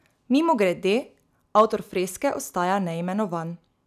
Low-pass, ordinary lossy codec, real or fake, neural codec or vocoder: 14.4 kHz; none; real; none